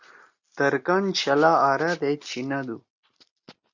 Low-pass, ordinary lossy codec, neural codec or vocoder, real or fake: 7.2 kHz; Opus, 64 kbps; none; real